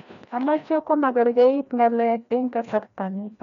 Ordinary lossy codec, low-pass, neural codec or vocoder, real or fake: MP3, 96 kbps; 7.2 kHz; codec, 16 kHz, 1 kbps, FreqCodec, larger model; fake